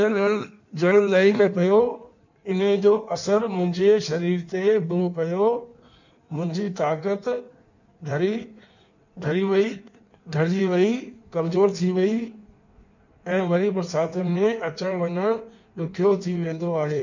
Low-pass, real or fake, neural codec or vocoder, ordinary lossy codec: 7.2 kHz; fake; codec, 16 kHz in and 24 kHz out, 1.1 kbps, FireRedTTS-2 codec; none